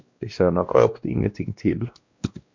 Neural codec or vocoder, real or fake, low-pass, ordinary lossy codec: codec, 16 kHz, 1 kbps, X-Codec, WavLM features, trained on Multilingual LibriSpeech; fake; 7.2 kHz; MP3, 64 kbps